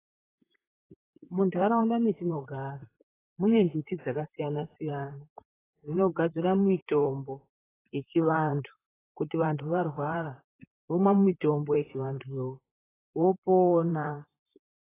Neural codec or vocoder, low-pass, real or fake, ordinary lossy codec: vocoder, 44.1 kHz, 128 mel bands, Pupu-Vocoder; 3.6 kHz; fake; AAC, 16 kbps